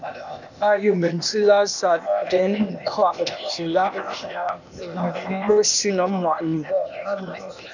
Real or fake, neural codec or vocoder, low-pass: fake; codec, 16 kHz, 0.8 kbps, ZipCodec; 7.2 kHz